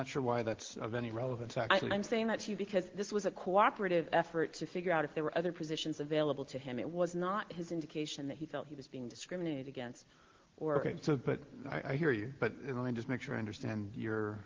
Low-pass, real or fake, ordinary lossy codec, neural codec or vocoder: 7.2 kHz; real; Opus, 16 kbps; none